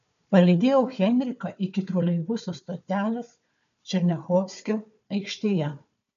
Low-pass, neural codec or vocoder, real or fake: 7.2 kHz; codec, 16 kHz, 4 kbps, FunCodec, trained on Chinese and English, 50 frames a second; fake